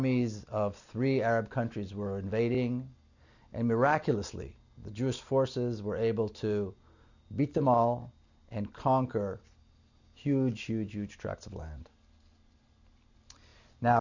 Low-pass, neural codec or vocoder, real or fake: 7.2 kHz; none; real